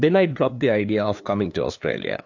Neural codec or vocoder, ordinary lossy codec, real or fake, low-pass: codec, 16 kHz, 2 kbps, FunCodec, trained on LibriTTS, 25 frames a second; AAC, 48 kbps; fake; 7.2 kHz